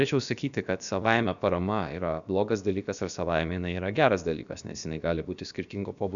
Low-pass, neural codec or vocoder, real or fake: 7.2 kHz; codec, 16 kHz, about 1 kbps, DyCAST, with the encoder's durations; fake